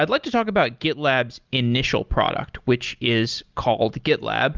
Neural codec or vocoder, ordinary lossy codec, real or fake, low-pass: none; Opus, 32 kbps; real; 7.2 kHz